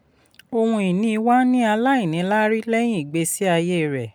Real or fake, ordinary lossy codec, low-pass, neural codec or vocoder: real; none; 19.8 kHz; none